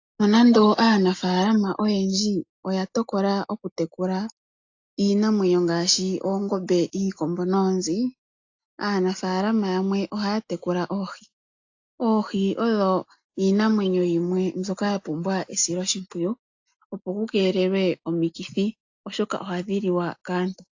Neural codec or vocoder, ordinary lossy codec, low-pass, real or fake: none; AAC, 48 kbps; 7.2 kHz; real